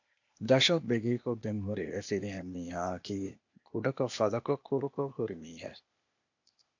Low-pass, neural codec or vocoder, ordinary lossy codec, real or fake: 7.2 kHz; codec, 16 kHz, 0.8 kbps, ZipCodec; AAC, 48 kbps; fake